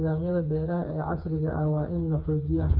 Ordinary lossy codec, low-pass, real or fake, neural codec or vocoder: none; 5.4 kHz; fake; codec, 16 kHz, 4 kbps, FreqCodec, smaller model